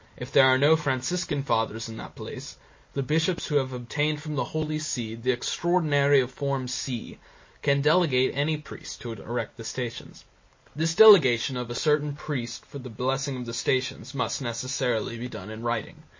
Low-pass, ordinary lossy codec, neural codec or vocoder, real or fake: 7.2 kHz; MP3, 32 kbps; none; real